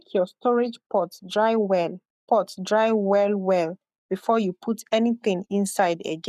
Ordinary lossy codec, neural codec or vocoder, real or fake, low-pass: none; codec, 44.1 kHz, 7.8 kbps, Pupu-Codec; fake; 14.4 kHz